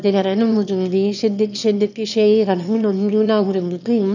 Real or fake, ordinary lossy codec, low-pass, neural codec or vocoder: fake; none; 7.2 kHz; autoencoder, 22.05 kHz, a latent of 192 numbers a frame, VITS, trained on one speaker